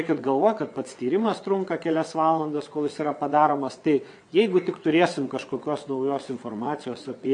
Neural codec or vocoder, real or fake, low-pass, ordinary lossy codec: vocoder, 22.05 kHz, 80 mel bands, WaveNeXt; fake; 9.9 kHz; MP3, 64 kbps